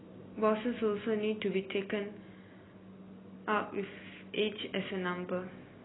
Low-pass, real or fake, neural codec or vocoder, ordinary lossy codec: 7.2 kHz; real; none; AAC, 16 kbps